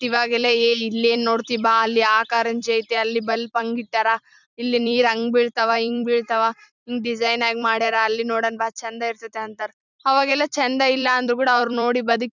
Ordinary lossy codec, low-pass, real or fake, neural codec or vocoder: none; 7.2 kHz; fake; vocoder, 44.1 kHz, 128 mel bands every 256 samples, BigVGAN v2